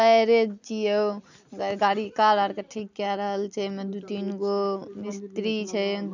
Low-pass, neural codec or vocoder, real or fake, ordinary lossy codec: 7.2 kHz; none; real; none